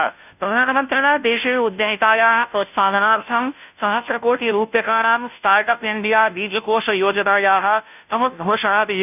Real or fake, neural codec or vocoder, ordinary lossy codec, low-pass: fake; codec, 16 kHz, 0.5 kbps, FunCodec, trained on Chinese and English, 25 frames a second; none; 3.6 kHz